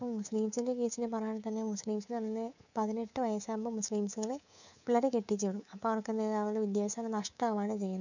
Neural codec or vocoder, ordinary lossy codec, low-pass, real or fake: codec, 24 kHz, 3.1 kbps, DualCodec; none; 7.2 kHz; fake